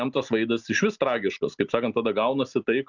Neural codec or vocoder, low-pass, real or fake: none; 7.2 kHz; real